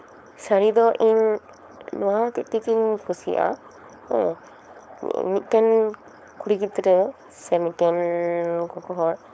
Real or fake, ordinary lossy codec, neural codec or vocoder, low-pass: fake; none; codec, 16 kHz, 4.8 kbps, FACodec; none